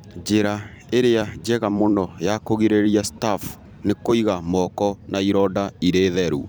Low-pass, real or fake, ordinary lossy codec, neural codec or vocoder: none; fake; none; vocoder, 44.1 kHz, 128 mel bands every 256 samples, BigVGAN v2